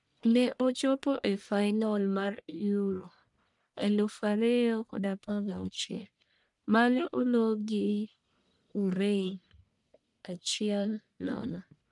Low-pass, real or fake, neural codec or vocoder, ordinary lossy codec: 10.8 kHz; fake; codec, 44.1 kHz, 1.7 kbps, Pupu-Codec; none